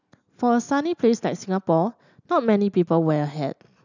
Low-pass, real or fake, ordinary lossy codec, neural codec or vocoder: 7.2 kHz; real; none; none